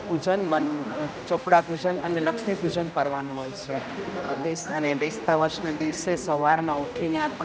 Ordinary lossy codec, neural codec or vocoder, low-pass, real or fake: none; codec, 16 kHz, 1 kbps, X-Codec, HuBERT features, trained on general audio; none; fake